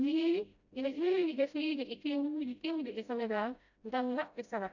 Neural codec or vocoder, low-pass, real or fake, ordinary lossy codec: codec, 16 kHz, 0.5 kbps, FreqCodec, smaller model; 7.2 kHz; fake; none